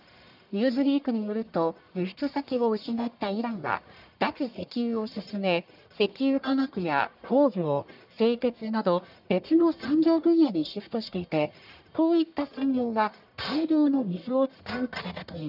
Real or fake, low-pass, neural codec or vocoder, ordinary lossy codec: fake; 5.4 kHz; codec, 44.1 kHz, 1.7 kbps, Pupu-Codec; none